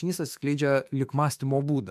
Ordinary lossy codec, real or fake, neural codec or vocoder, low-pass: MP3, 96 kbps; fake; autoencoder, 48 kHz, 32 numbers a frame, DAC-VAE, trained on Japanese speech; 14.4 kHz